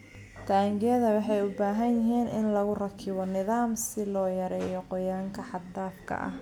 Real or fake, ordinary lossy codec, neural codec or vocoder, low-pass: real; none; none; 19.8 kHz